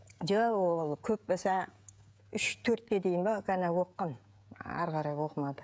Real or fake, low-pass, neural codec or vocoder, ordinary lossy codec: fake; none; codec, 16 kHz, 16 kbps, FreqCodec, smaller model; none